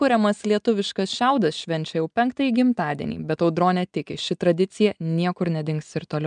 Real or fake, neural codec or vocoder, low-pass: real; none; 9.9 kHz